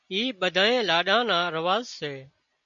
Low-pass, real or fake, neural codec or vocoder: 7.2 kHz; real; none